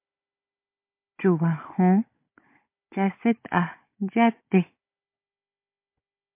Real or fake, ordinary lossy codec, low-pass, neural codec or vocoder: fake; MP3, 24 kbps; 3.6 kHz; codec, 16 kHz, 16 kbps, FunCodec, trained on Chinese and English, 50 frames a second